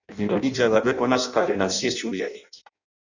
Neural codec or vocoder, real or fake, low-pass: codec, 16 kHz in and 24 kHz out, 0.6 kbps, FireRedTTS-2 codec; fake; 7.2 kHz